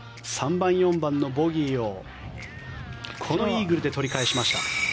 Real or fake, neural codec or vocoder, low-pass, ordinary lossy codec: real; none; none; none